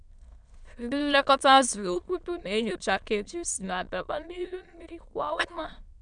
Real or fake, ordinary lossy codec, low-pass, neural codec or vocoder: fake; none; 9.9 kHz; autoencoder, 22.05 kHz, a latent of 192 numbers a frame, VITS, trained on many speakers